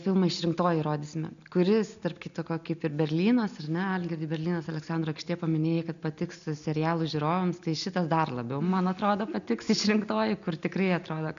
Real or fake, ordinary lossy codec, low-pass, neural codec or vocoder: real; MP3, 96 kbps; 7.2 kHz; none